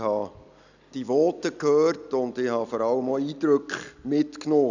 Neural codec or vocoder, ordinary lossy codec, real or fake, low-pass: none; none; real; 7.2 kHz